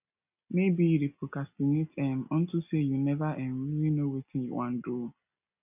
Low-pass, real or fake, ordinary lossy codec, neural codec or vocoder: 3.6 kHz; real; none; none